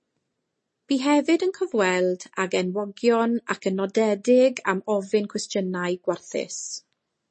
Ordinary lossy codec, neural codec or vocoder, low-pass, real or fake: MP3, 32 kbps; none; 10.8 kHz; real